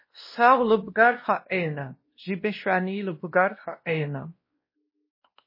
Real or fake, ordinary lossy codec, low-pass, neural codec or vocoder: fake; MP3, 24 kbps; 5.4 kHz; codec, 16 kHz, 1 kbps, X-Codec, WavLM features, trained on Multilingual LibriSpeech